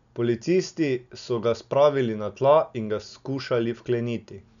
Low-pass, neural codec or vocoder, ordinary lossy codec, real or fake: 7.2 kHz; none; none; real